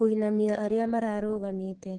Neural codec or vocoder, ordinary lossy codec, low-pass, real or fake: codec, 32 kHz, 1.9 kbps, SNAC; Opus, 16 kbps; 9.9 kHz; fake